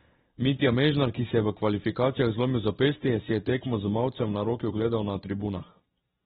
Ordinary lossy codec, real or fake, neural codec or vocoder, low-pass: AAC, 16 kbps; real; none; 7.2 kHz